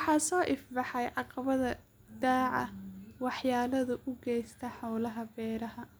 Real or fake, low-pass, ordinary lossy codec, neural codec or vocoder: real; none; none; none